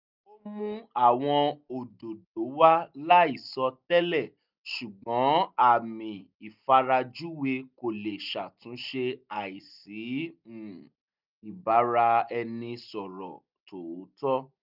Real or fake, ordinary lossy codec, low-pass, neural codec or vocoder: real; none; 5.4 kHz; none